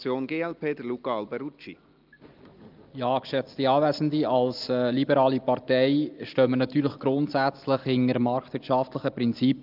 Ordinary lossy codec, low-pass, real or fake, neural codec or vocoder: Opus, 32 kbps; 5.4 kHz; real; none